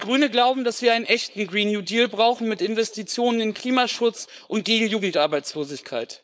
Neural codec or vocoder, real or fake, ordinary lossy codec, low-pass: codec, 16 kHz, 4.8 kbps, FACodec; fake; none; none